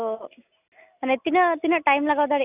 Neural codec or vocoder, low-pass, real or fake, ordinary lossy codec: none; 3.6 kHz; real; none